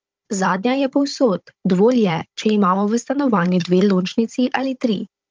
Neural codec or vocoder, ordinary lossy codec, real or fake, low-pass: codec, 16 kHz, 16 kbps, FunCodec, trained on Chinese and English, 50 frames a second; Opus, 24 kbps; fake; 7.2 kHz